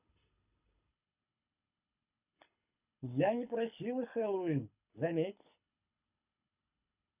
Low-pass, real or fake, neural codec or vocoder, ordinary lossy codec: 3.6 kHz; fake; codec, 24 kHz, 6 kbps, HILCodec; none